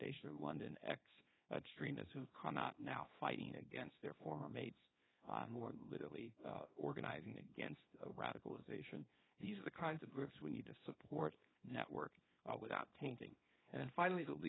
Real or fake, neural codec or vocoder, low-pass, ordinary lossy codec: fake; codec, 24 kHz, 0.9 kbps, WavTokenizer, small release; 7.2 kHz; AAC, 16 kbps